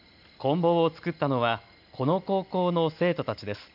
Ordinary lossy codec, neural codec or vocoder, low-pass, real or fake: none; none; 5.4 kHz; real